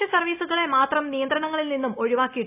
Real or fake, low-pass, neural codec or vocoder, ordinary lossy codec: real; 3.6 kHz; none; none